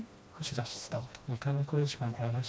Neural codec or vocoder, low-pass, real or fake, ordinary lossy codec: codec, 16 kHz, 1 kbps, FreqCodec, smaller model; none; fake; none